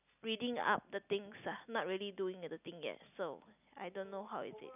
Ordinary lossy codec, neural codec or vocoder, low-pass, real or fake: none; none; 3.6 kHz; real